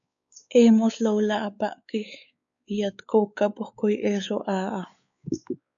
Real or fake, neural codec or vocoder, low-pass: fake; codec, 16 kHz, 4 kbps, X-Codec, WavLM features, trained on Multilingual LibriSpeech; 7.2 kHz